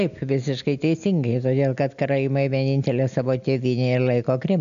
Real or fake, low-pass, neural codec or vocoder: real; 7.2 kHz; none